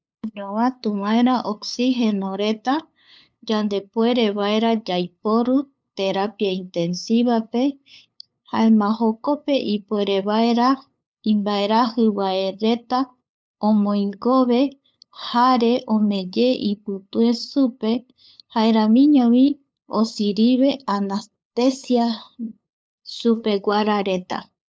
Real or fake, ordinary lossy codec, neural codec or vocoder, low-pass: fake; none; codec, 16 kHz, 8 kbps, FunCodec, trained on LibriTTS, 25 frames a second; none